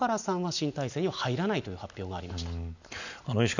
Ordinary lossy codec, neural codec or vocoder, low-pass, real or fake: none; none; 7.2 kHz; real